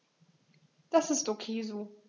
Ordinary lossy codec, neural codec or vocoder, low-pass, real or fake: none; none; 7.2 kHz; real